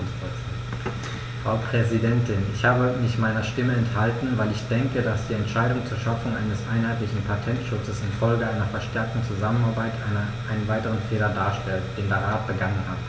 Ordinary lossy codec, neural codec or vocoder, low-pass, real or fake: none; none; none; real